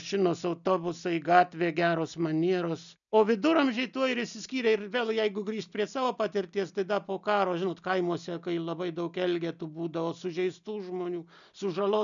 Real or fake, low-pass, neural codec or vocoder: real; 7.2 kHz; none